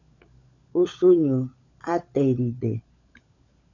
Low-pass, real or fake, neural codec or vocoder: 7.2 kHz; fake; codec, 16 kHz, 16 kbps, FunCodec, trained on LibriTTS, 50 frames a second